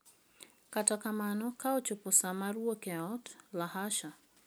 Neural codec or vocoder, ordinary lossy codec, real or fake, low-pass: none; none; real; none